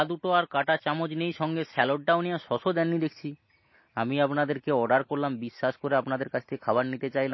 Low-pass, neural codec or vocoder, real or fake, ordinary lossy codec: 7.2 kHz; none; real; MP3, 24 kbps